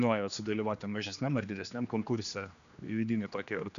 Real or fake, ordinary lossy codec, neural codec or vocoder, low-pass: fake; MP3, 96 kbps; codec, 16 kHz, 2 kbps, X-Codec, HuBERT features, trained on general audio; 7.2 kHz